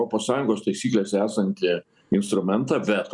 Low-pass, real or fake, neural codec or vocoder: 10.8 kHz; real; none